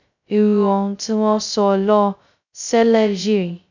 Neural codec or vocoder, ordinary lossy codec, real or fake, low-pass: codec, 16 kHz, 0.2 kbps, FocalCodec; none; fake; 7.2 kHz